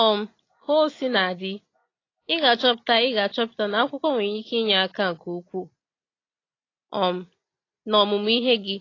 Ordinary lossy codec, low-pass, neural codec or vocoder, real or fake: AAC, 32 kbps; 7.2 kHz; none; real